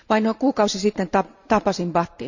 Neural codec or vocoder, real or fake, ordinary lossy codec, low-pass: vocoder, 44.1 kHz, 128 mel bands every 256 samples, BigVGAN v2; fake; none; 7.2 kHz